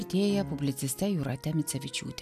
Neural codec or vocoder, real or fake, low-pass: none; real; 14.4 kHz